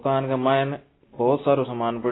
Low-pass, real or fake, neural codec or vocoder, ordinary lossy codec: 7.2 kHz; real; none; AAC, 16 kbps